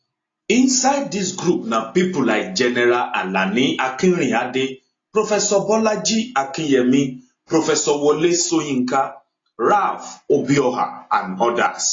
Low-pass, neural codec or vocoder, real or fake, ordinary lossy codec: 7.2 kHz; none; real; AAC, 32 kbps